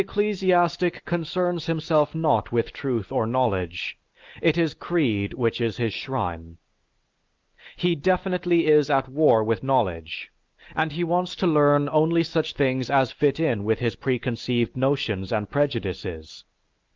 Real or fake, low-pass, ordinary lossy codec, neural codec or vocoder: real; 7.2 kHz; Opus, 24 kbps; none